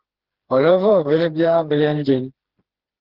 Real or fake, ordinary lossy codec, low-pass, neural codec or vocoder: fake; Opus, 16 kbps; 5.4 kHz; codec, 16 kHz, 2 kbps, FreqCodec, smaller model